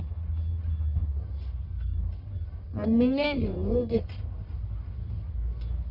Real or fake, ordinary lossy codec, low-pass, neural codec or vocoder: fake; none; 5.4 kHz; codec, 44.1 kHz, 1.7 kbps, Pupu-Codec